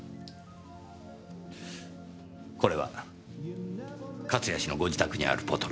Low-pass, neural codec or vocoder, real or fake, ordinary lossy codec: none; none; real; none